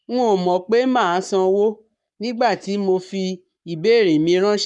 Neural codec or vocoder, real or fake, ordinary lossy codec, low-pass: codec, 44.1 kHz, 7.8 kbps, Pupu-Codec; fake; none; 10.8 kHz